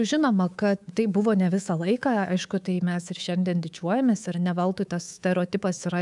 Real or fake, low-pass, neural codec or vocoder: fake; 10.8 kHz; codec, 24 kHz, 3.1 kbps, DualCodec